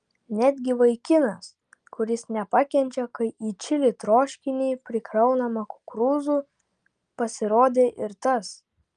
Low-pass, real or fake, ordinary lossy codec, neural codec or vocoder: 9.9 kHz; real; Opus, 32 kbps; none